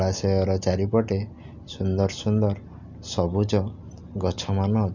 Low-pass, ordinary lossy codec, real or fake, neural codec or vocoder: 7.2 kHz; none; real; none